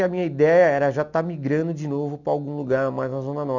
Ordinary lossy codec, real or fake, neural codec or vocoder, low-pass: none; real; none; 7.2 kHz